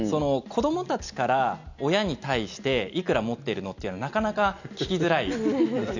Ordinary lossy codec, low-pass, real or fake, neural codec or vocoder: none; 7.2 kHz; real; none